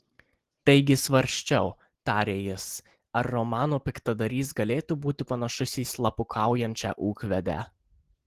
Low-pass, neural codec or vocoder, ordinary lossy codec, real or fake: 14.4 kHz; none; Opus, 16 kbps; real